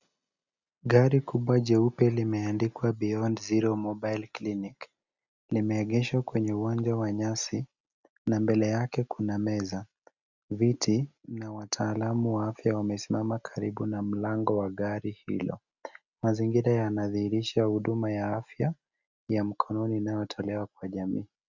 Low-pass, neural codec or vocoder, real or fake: 7.2 kHz; none; real